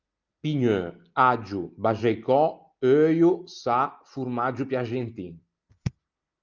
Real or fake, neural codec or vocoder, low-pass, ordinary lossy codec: real; none; 7.2 kHz; Opus, 24 kbps